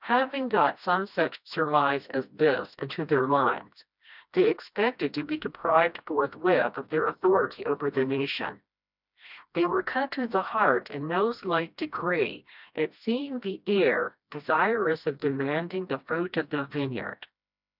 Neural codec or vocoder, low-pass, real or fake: codec, 16 kHz, 1 kbps, FreqCodec, smaller model; 5.4 kHz; fake